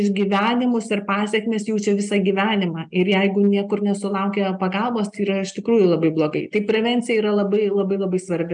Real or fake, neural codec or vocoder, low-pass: real; none; 9.9 kHz